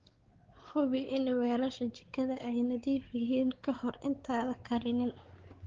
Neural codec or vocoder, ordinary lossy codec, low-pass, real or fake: codec, 16 kHz, 4 kbps, X-Codec, WavLM features, trained on Multilingual LibriSpeech; Opus, 16 kbps; 7.2 kHz; fake